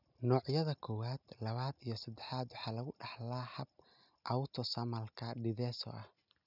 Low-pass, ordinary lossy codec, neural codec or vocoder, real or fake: 5.4 kHz; none; none; real